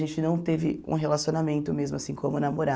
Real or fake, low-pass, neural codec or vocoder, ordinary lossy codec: real; none; none; none